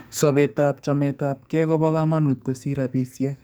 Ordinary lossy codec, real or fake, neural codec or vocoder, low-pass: none; fake; codec, 44.1 kHz, 2.6 kbps, SNAC; none